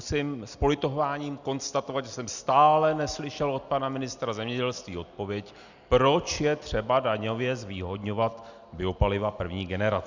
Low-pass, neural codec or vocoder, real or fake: 7.2 kHz; none; real